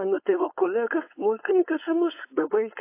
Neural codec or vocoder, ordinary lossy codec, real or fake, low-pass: codec, 16 kHz, 4.8 kbps, FACodec; AAC, 24 kbps; fake; 3.6 kHz